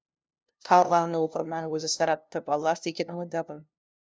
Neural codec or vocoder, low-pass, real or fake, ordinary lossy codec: codec, 16 kHz, 0.5 kbps, FunCodec, trained on LibriTTS, 25 frames a second; none; fake; none